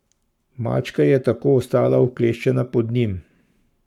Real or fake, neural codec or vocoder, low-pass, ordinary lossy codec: fake; codec, 44.1 kHz, 7.8 kbps, Pupu-Codec; 19.8 kHz; none